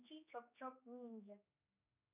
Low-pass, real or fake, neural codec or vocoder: 3.6 kHz; fake; codec, 16 kHz, 4 kbps, X-Codec, HuBERT features, trained on general audio